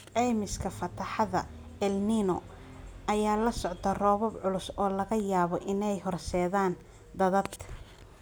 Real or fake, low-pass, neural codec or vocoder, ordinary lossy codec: real; none; none; none